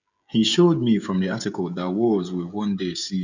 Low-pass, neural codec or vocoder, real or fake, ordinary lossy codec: 7.2 kHz; codec, 16 kHz, 16 kbps, FreqCodec, smaller model; fake; none